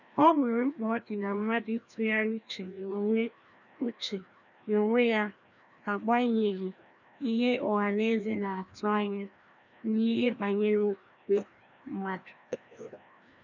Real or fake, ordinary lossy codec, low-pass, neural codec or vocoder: fake; none; 7.2 kHz; codec, 16 kHz, 1 kbps, FreqCodec, larger model